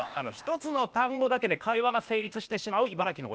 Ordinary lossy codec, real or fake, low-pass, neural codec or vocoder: none; fake; none; codec, 16 kHz, 0.8 kbps, ZipCodec